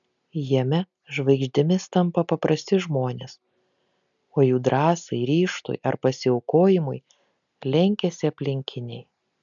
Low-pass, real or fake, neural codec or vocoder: 7.2 kHz; real; none